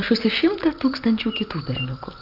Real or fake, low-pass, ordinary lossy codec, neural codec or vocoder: real; 5.4 kHz; Opus, 32 kbps; none